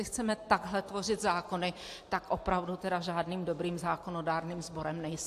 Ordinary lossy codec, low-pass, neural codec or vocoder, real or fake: AAC, 64 kbps; 14.4 kHz; none; real